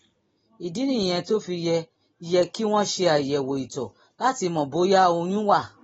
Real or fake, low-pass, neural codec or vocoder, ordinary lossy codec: real; 19.8 kHz; none; AAC, 24 kbps